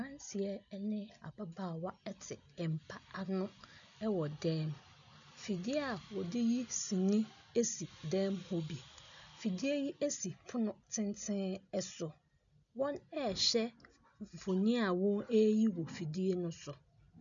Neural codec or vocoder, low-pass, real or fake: none; 7.2 kHz; real